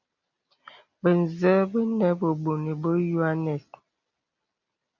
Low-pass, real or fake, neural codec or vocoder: 7.2 kHz; real; none